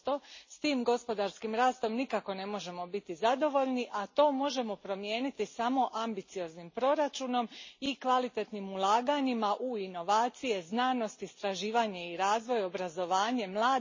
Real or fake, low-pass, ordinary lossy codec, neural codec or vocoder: real; 7.2 kHz; MP3, 32 kbps; none